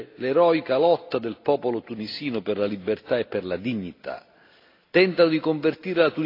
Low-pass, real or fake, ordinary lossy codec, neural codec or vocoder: 5.4 kHz; real; AAC, 32 kbps; none